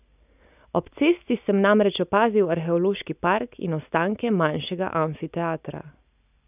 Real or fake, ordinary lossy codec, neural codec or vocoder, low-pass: real; none; none; 3.6 kHz